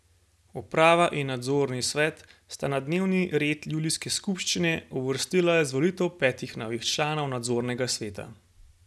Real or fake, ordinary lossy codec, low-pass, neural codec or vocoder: real; none; none; none